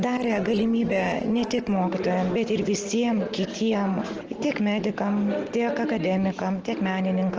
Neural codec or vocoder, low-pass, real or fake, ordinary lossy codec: none; 7.2 kHz; real; Opus, 16 kbps